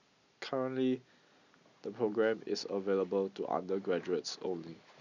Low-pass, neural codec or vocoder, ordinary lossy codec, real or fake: 7.2 kHz; none; none; real